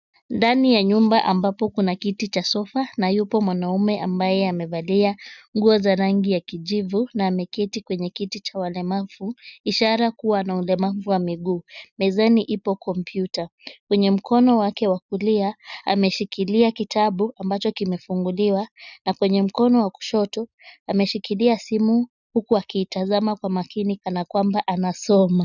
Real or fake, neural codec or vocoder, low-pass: real; none; 7.2 kHz